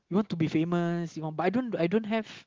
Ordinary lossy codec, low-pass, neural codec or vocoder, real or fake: Opus, 16 kbps; 7.2 kHz; none; real